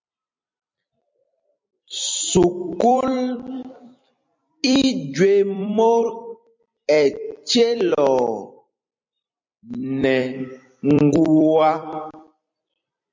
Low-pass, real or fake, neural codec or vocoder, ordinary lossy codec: 7.2 kHz; fake; vocoder, 44.1 kHz, 128 mel bands every 256 samples, BigVGAN v2; MP3, 48 kbps